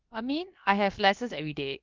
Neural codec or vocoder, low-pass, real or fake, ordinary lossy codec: codec, 16 kHz, about 1 kbps, DyCAST, with the encoder's durations; 7.2 kHz; fake; Opus, 24 kbps